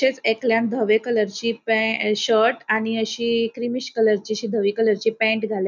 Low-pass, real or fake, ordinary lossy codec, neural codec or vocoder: 7.2 kHz; real; none; none